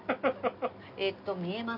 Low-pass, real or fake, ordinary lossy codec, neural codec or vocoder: 5.4 kHz; real; none; none